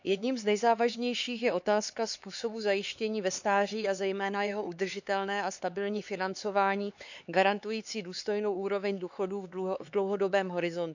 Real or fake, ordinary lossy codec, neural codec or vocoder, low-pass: fake; none; codec, 16 kHz, 4 kbps, X-Codec, HuBERT features, trained on LibriSpeech; 7.2 kHz